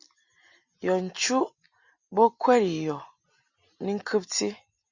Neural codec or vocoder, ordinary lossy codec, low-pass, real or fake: none; Opus, 64 kbps; 7.2 kHz; real